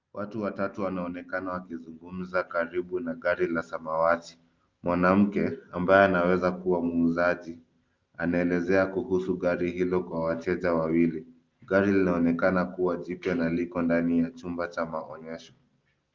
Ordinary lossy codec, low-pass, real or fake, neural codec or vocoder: Opus, 32 kbps; 7.2 kHz; real; none